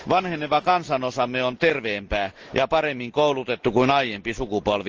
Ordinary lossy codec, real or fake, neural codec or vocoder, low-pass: Opus, 16 kbps; real; none; 7.2 kHz